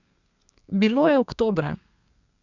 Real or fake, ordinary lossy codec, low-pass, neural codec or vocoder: fake; none; 7.2 kHz; codec, 32 kHz, 1.9 kbps, SNAC